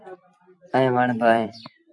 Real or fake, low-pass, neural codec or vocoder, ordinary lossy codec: fake; 10.8 kHz; vocoder, 44.1 kHz, 128 mel bands every 256 samples, BigVGAN v2; AAC, 64 kbps